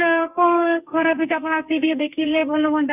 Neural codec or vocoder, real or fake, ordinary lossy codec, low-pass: codec, 32 kHz, 1.9 kbps, SNAC; fake; none; 3.6 kHz